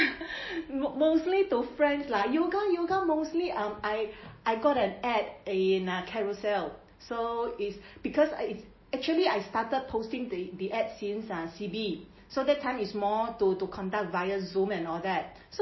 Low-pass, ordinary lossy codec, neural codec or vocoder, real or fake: 7.2 kHz; MP3, 24 kbps; none; real